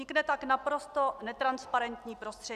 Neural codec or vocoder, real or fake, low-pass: none; real; 14.4 kHz